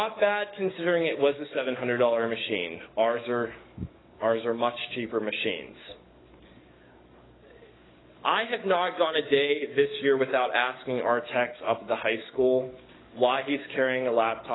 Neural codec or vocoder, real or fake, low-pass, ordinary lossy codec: codec, 44.1 kHz, 7.8 kbps, DAC; fake; 7.2 kHz; AAC, 16 kbps